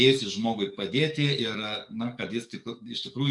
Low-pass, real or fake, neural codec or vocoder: 10.8 kHz; fake; codec, 44.1 kHz, 7.8 kbps, DAC